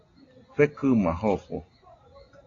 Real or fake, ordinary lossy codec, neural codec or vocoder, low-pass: real; AAC, 32 kbps; none; 7.2 kHz